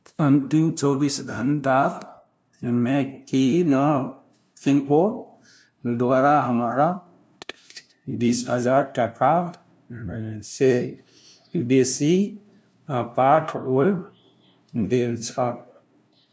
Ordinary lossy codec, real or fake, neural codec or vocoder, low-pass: none; fake; codec, 16 kHz, 0.5 kbps, FunCodec, trained on LibriTTS, 25 frames a second; none